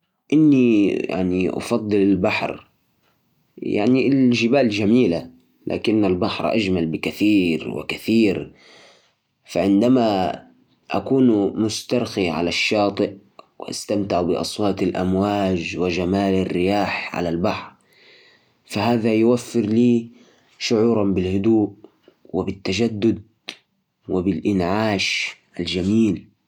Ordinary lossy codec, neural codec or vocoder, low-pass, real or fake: none; none; 19.8 kHz; real